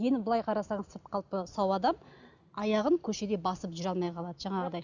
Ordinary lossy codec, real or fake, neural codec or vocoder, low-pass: none; real; none; 7.2 kHz